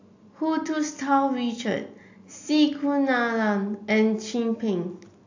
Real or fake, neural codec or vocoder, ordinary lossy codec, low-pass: real; none; AAC, 48 kbps; 7.2 kHz